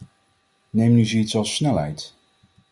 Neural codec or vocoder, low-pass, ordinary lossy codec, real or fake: none; 10.8 kHz; Opus, 64 kbps; real